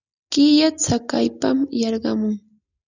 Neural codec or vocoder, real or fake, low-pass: none; real; 7.2 kHz